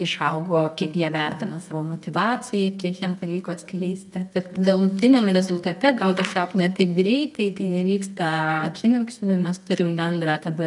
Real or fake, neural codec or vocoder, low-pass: fake; codec, 24 kHz, 0.9 kbps, WavTokenizer, medium music audio release; 10.8 kHz